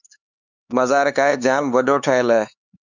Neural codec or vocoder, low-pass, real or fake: codec, 16 kHz, 4 kbps, X-Codec, HuBERT features, trained on LibriSpeech; 7.2 kHz; fake